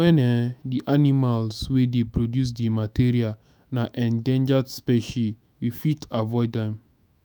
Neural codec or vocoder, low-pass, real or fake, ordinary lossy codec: autoencoder, 48 kHz, 128 numbers a frame, DAC-VAE, trained on Japanese speech; none; fake; none